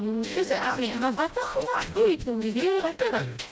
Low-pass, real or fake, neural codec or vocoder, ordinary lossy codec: none; fake; codec, 16 kHz, 0.5 kbps, FreqCodec, smaller model; none